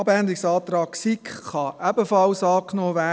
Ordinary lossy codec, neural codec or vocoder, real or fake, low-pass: none; none; real; none